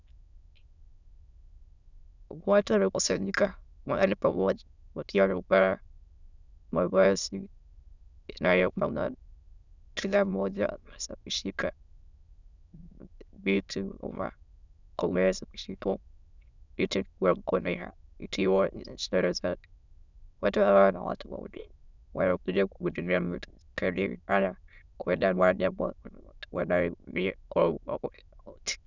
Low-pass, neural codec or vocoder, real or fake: 7.2 kHz; autoencoder, 22.05 kHz, a latent of 192 numbers a frame, VITS, trained on many speakers; fake